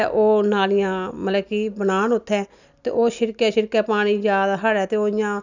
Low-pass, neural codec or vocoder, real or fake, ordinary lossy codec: 7.2 kHz; none; real; none